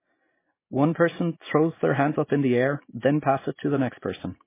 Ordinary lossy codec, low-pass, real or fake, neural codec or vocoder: MP3, 16 kbps; 3.6 kHz; real; none